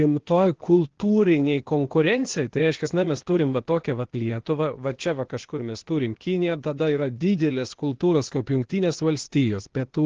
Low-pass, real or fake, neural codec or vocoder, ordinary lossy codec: 7.2 kHz; fake; codec, 16 kHz, 0.8 kbps, ZipCodec; Opus, 16 kbps